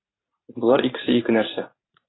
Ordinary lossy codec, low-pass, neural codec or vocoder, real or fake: AAC, 16 kbps; 7.2 kHz; vocoder, 44.1 kHz, 128 mel bands every 512 samples, BigVGAN v2; fake